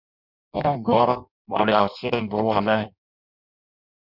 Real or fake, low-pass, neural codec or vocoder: fake; 5.4 kHz; codec, 16 kHz in and 24 kHz out, 0.6 kbps, FireRedTTS-2 codec